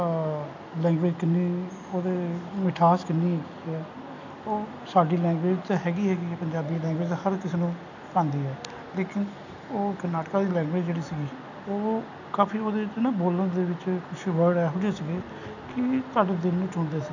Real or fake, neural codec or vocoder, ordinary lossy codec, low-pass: real; none; none; 7.2 kHz